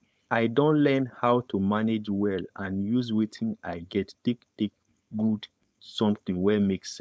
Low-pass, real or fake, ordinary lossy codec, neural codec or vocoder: none; fake; none; codec, 16 kHz, 4.8 kbps, FACodec